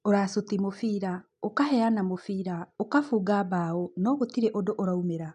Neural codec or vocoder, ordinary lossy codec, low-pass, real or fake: none; none; 9.9 kHz; real